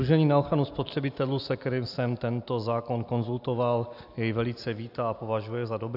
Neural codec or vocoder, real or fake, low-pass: none; real; 5.4 kHz